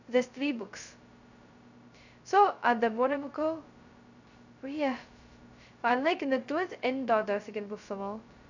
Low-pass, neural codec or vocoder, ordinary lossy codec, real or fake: 7.2 kHz; codec, 16 kHz, 0.2 kbps, FocalCodec; none; fake